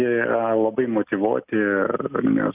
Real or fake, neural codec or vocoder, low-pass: real; none; 3.6 kHz